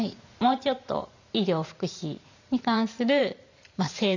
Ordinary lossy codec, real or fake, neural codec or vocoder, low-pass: none; fake; vocoder, 44.1 kHz, 128 mel bands every 512 samples, BigVGAN v2; 7.2 kHz